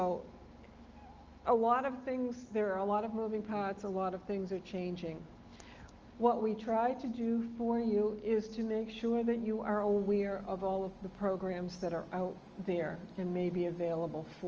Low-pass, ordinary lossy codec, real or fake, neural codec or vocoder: 7.2 kHz; Opus, 24 kbps; real; none